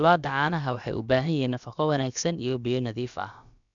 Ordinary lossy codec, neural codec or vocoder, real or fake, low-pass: none; codec, 16 kHz, about 1 kbps, DyCAST, with the encoder's durations; fake; 7.2 kHz